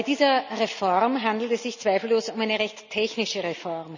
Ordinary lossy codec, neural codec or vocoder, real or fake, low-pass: none; none; real; 7.2 kHz